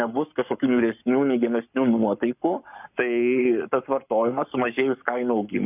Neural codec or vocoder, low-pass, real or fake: codec, 44.1 kHz, 7.8 kbps, Pupu-Codec; 3.6 kHz; fake